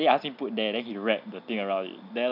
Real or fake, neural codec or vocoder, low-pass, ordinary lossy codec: real; none; 5.4 kHz; none